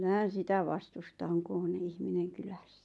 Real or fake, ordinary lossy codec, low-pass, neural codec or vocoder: real; none; none; none